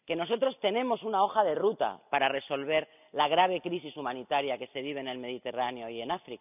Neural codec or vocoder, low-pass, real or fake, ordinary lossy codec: none; 3.6 kHz; real; none